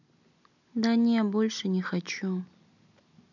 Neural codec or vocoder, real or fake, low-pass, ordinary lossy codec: none; real; 7.2 kHz; none